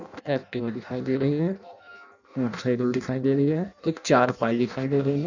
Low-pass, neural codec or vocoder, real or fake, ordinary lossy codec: 7.2 kHz; codec, 16 kHz in and 24 kHz out, 0.6 kbps, FireRedTTS-2 codec; fake; none